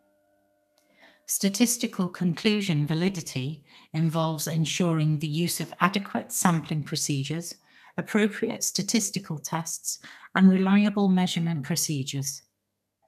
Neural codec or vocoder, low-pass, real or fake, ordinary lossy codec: codec, 32 kHz, 1.9 kbps, SNAC; 14.4 kHz; fake; none